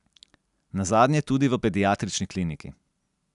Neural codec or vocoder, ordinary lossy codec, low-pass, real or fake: none; none; 10.8 kHz; real